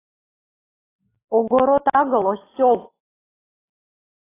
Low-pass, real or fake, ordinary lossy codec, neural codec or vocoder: 3.6 kHz; real; AAC, 16 kbps; none